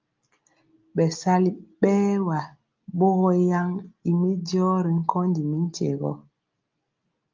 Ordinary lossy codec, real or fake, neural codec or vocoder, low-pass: Opus, 32 kbps; real; none; 7.2 kHz